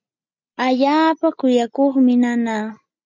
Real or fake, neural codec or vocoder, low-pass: real; none; 7.2 kHz